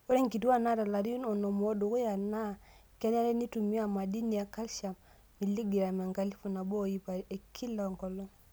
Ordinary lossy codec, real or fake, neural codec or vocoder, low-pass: none; real; none; none